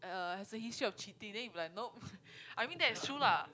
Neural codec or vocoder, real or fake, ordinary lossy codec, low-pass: none; real; none; none